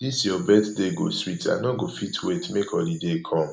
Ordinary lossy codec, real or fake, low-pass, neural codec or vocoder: none; real; none; none